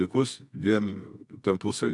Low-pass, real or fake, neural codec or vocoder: 10.8 kHz; fake; codec, 24 kHz, 0.9 kbps, WavTokenizer, medium music audio release